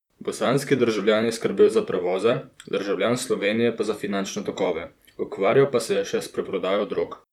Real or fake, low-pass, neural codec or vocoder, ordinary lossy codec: fake; 19.8 kHz; vocoder, 44.1 kHz, 128 mel bands, Pupu-Vocoder; none